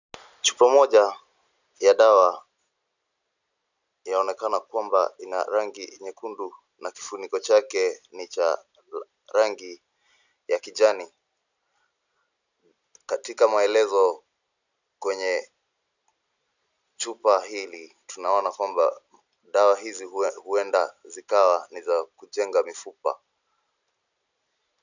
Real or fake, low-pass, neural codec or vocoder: real; 7.2 kHz; none